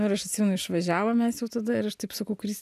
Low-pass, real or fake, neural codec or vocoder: 14.4 kHz; real; none